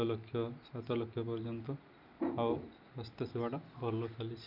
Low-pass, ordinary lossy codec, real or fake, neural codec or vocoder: 5.4 kHz; none; real; none